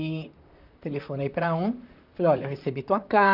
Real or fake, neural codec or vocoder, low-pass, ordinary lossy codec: fake; vocoder, 44.1 kHz, 128 mel bands, Pupu-Vocoder; 5.4 kHz; none